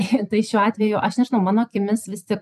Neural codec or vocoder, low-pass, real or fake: vocoder, 44.1 kHz, 128 mel bands every 256 samples, BigVGAN v2; 14.4 kHz; fake